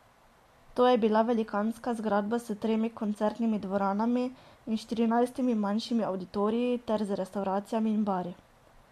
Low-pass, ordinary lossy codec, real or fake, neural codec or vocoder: 14.4 kHz; MP3, 64 kbps; real; none